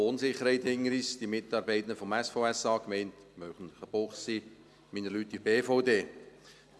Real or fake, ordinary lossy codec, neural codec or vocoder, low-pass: real; none; none; none